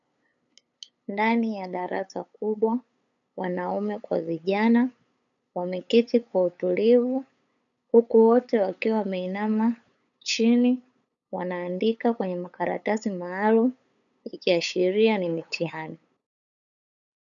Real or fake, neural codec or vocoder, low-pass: fake; codec, 16 kHz, 8 kbps, FunCodec, trained on LibriTTS, 25 frames a second; 7.2 kHz